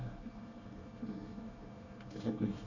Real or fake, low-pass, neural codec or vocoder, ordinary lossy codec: fake; 7.2 kHz; codec, 24 kHz, 1 kbps, SNAC; none